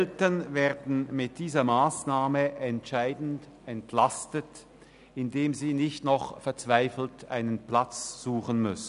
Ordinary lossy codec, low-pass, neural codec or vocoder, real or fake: none; 10.8 kHz; none; real